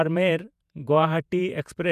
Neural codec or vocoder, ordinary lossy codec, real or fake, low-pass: vocoder, 48 kHz, 128 mel bands, Vocos; none; fake; 14.4 kHz